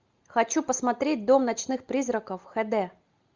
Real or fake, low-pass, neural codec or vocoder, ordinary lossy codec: real; 7.2 kHz; none; Opus, 32 kbps